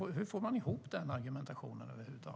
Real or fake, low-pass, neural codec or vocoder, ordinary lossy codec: real; none; none; none